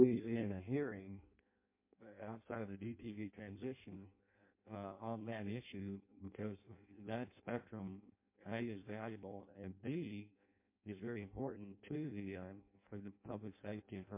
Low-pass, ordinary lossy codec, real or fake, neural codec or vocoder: 3.6 kHz; MP3, 24 kbps; fake; codec, 16 kHz in and 24 kHz out, 0.6 kbps, FireRedTTS-2 codec